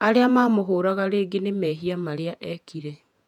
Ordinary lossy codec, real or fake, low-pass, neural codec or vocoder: none; fake; 19.8 kHz; vocoder, 48 kHz, 128 mel bands, Vocos